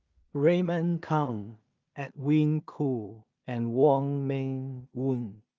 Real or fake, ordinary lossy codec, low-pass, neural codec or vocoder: fake; Opus, 24 kbps; 7.2 kHz; codec, 16 kHz in and 24 kHz out, 0.4 kbps, LongCat-Audio-Codec, two codebook decoder